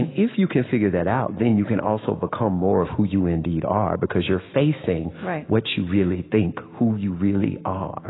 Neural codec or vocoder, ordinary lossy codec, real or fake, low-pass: autoencoder, 48 kHz, 128 numbers a frame, DAC-VAE, trained on Japanese speech; AAC, 16 kbps; fake; 7.2 kHz